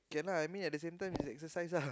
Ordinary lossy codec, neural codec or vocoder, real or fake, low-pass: none; none; real; none